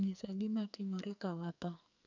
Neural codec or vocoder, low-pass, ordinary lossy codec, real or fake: codec, 44.1 kHz, 2.6 kbps, SNAC; 7.2 kHz; none; fake